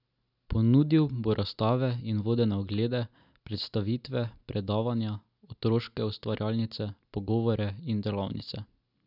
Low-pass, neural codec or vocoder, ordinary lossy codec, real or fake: 5.4 kHz; none; none; real